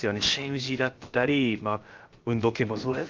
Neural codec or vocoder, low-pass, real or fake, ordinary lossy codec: codec, 16 kHz, about 1 kbps, DyCAST, with the encoder's durations; 7.2 kHz; fake; Opus, 24 kbps